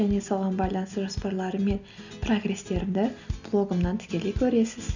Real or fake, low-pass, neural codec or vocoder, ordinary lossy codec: real; 7.2 kHz; none; none